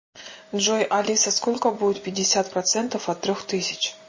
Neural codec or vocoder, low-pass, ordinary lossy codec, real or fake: none; 7.2 kHz; MP3, 32 kbps; real